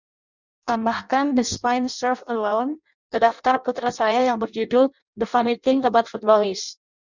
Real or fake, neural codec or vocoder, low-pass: fake; codec, 16 kHz in and 24 kHz out, 0.6 kbps, FireRedTTS-2 codec; 7.2 kHz